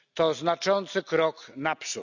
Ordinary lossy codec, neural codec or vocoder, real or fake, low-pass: none; none; real; 7.2 kHz